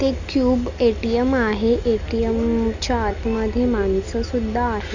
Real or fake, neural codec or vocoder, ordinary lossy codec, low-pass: real; none; Opus, 64 kbps; 7.2 kHz